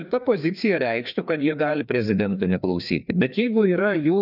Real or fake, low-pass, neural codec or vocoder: fake; 5.4 kHz; codec, 16 kHz, 2 kbps, FreqCodec, larger model